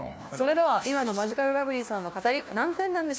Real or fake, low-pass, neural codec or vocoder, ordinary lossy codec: fake; none; codec, 16 kHz, 1 kbps, FunCodec, trained on LibriTTS, 50 frames a second; none